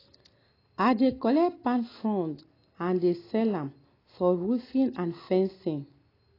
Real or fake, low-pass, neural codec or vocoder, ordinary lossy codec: real; 5.4 kHz; none; AAC, 24 kbps